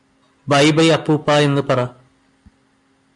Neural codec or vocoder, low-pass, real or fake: none; 10.8 kHz; real